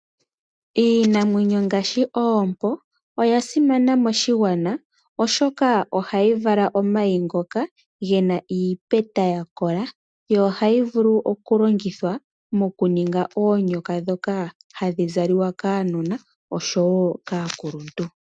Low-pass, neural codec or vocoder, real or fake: 9.9 kHz; none; real